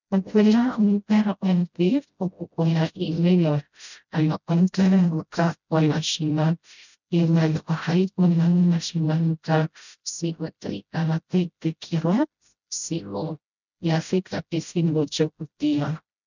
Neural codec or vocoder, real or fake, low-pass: codec, 16 kHz, 0.5 kbps, FreqCodec, smaller model; fake; 7.2 kHz